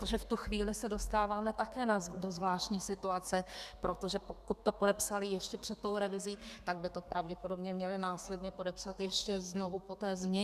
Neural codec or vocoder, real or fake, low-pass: codec, 32 kHz, 1.9 kbps, SNAC; fake; 14.4 kHz